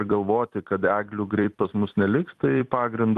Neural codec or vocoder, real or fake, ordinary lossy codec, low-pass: vocoder, 44.1 kHz, 128 mel bands every 256 samples, BigVGAN v2; fake; Opus, 32 kbps; 14.4 kHz